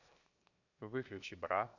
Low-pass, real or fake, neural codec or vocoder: 7.2 kHz; fake; codec, 16 kHz, 0.7 kbps, FocalCodec